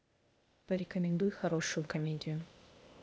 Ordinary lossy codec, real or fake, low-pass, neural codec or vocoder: none; fake; none; codec, 16 kHz, 0.8 kbps, ZipCodec